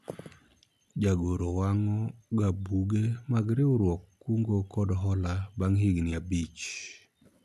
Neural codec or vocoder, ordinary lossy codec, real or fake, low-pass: none; none; real; 14.4 kHz